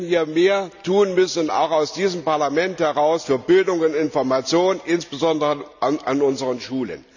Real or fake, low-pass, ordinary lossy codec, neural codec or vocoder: real; 7.2 kHz; none; none